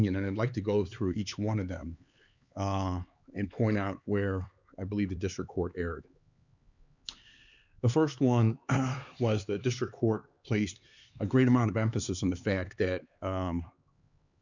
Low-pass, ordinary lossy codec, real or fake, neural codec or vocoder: 7.2 kHz; Opus, 64 kbps; fake; codec, 16 kHz, 4 kbps, X-Codec, HuBERT features, trained on LibriSpeech